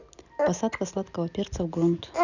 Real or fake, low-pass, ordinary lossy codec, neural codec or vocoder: real; 7.2 kHz; none; none